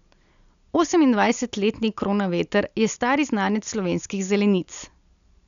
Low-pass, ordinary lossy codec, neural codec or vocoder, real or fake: 7.2 kHz; none; none; real